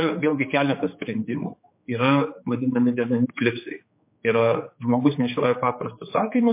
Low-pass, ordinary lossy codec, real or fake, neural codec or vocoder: 3.6 kHz; MP3, 24 kbps; fake; codec, 16 kHz, 4 kbps, X-Codec, HuBERT features, trained on general audio